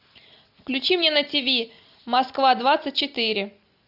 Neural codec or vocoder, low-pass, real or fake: none; 5.4 kHz; real